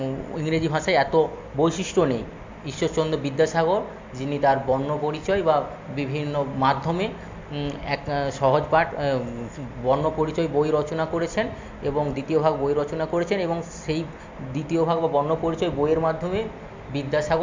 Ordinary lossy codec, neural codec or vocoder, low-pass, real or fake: MP3, 48 kbps; none; 7.2 kHz; real